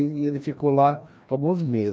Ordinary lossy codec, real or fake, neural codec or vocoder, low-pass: none; fake; codec, 16 kHz, 1 kbps, FreqCodec, larger model; none